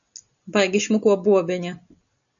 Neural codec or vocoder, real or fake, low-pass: none; real; 7.2 kHz